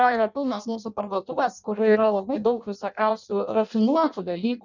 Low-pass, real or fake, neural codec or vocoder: 7.2 kHz; fake; codec, 16 kHz in and 24 kHz out, 0.6 kbps, FireRedTTS-2 codec